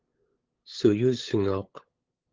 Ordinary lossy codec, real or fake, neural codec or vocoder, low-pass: Opus, 16 kbps; fake; codec, 16 kHz, 8 kbps, FunCodec, trained on LibriTTS, 25 frames a second; 7.2 kHz